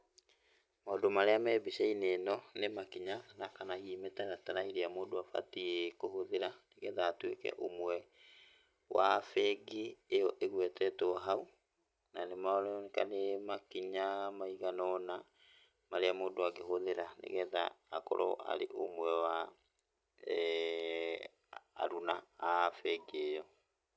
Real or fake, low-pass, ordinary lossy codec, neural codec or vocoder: real; none; none; none